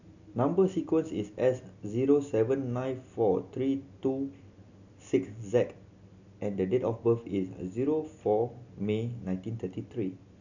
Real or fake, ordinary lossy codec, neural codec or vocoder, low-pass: real; none; none; 7.2 kHz